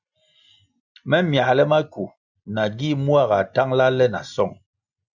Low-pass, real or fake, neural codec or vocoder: 7.2 kHz; real; none